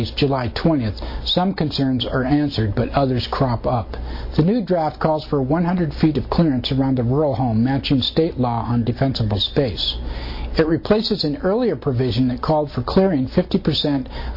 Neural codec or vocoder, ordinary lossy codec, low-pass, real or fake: none; MP3, 32 kbps; 5.4 kHz; real